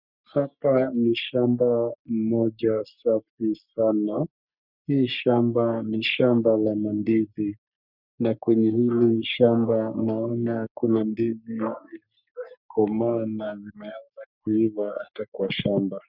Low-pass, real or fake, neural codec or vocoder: 5.4 kHz; fake; codec, 44.1 kHz, 3.4 kbps, Pupu-Codec